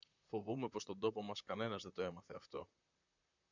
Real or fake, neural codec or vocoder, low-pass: fake; vocoder, 44.1 kHz, 128 mel bands, Pupu-Vocoder; 7.2 kHz